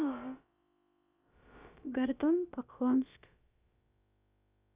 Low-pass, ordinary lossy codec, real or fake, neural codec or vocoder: 3.6 kHz; Opus, 64 kbps; fake; codec, 16 kHz, about 1 kbps, DyCAST, with the encoder's durations